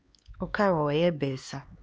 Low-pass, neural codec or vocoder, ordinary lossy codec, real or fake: none; codec, 16 kHz, 4 kbps, X-Codec, HuBERT features, trained on LibriSpeech; none; fake